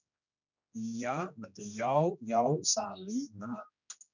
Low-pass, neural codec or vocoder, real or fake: 7.2 kHz; codec, 16 kHz, 1 kbps, X-Codec, HuBERT features, trained on general audio; fake